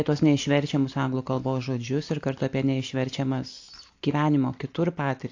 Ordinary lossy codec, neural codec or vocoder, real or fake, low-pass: AAC, 48 kbps; none; real; 7.2 kHz